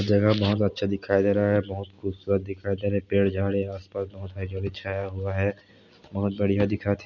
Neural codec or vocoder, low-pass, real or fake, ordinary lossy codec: none; 7.2 kHz; real; none